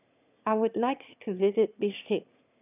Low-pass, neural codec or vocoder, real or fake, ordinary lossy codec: 3.6 kHz; autoencoder, 22.05 kHz, a latent of 192 numbers a frame, VITS, trained on one speaker; fake; none